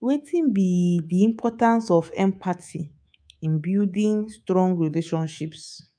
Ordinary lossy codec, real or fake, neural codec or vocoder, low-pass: none; fake; codec, 24 kHz, 3.1 kbps, DualCodec; 9.9 kHz